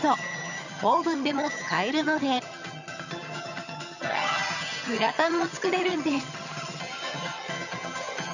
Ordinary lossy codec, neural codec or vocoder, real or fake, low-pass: none; vocoder, 22.05 kHz, 80 mel bands, HiFi-GAN; fake; 7.2 kHz